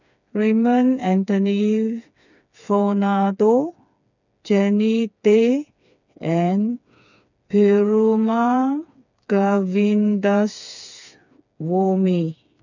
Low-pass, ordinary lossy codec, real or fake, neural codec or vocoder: 7.2 kHz; none; fake; codec, 16 kHz, 4 kbps, FreqCodec, smaller model